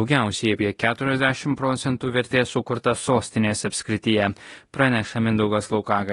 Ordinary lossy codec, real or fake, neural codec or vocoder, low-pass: AAC, 32 kbps; fake; codec, 24 kHz, 0.9 kbps, DualCodec; 10.8 kHz